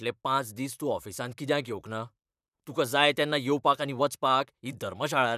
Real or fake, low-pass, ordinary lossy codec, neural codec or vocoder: fake; 14.4 kHz; none; vocoder, 44.1 kHz, 128 mel bands, Pupu-Vocoder